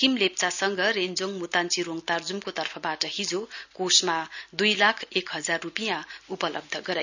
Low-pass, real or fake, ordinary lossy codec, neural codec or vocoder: 7.2 kHz; real; none; none